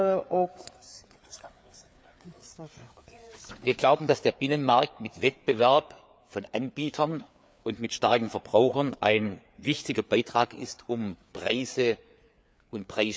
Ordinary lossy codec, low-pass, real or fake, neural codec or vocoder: none; none; fake; codec, 16 kHz, 4 kbps, FreqCodec, larger model